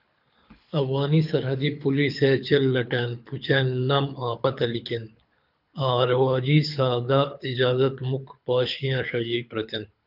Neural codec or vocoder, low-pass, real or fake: codec, 24 kHz, 6 kbps, HILCodec; 5.4 kHz; fake